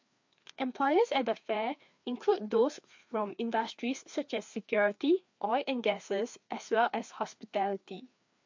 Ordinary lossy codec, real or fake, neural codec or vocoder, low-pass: MP3, 48 kbps; fake; codec, 16 kHz, 2 kbps, FreqCodec, larger model; 7.2 kHz